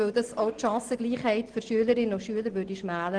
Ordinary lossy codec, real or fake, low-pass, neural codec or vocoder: Opus, 16 kbps; real; 9.9 kHz; none